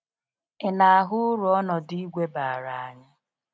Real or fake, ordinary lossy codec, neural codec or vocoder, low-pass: real; none; none; none